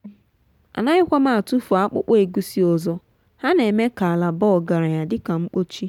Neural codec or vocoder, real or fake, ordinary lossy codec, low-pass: none; real; none; 19.8 kHz